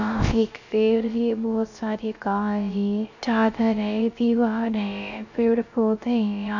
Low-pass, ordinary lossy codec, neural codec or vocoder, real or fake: 7.2 kHz; none; codec, 16 kHz, 0.3 kbps, FocalCodec; fake